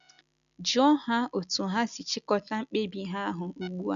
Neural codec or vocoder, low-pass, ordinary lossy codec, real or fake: none; 7.2 kHz; none; real